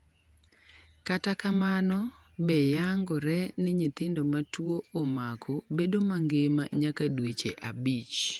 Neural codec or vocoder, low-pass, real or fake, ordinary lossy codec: vocoder, 48 kHz, 128 mel bands, Vocos; 19.8 kHz; fake; Opus, 32 kbps